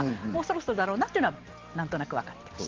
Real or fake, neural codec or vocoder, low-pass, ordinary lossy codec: real; none; 7.2 kHz; Opus, 16 kbps